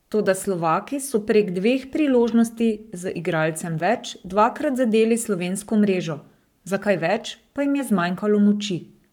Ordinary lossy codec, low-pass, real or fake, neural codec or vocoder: none; 19.8 kHz; fake; codec, 44.1 kHz, 7.8 kbps, Pupu-Codec